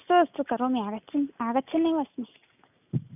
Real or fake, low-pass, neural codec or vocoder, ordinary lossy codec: real; 3.6 kHz; none; none